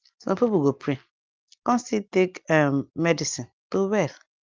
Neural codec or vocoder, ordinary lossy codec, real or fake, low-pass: none; Opus, 32 kbps; real; 7.2 kHz